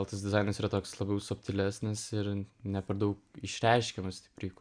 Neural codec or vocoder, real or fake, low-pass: none; real; 9.9 kHz